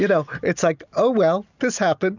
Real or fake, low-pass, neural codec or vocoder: real; 7.2 kHz; none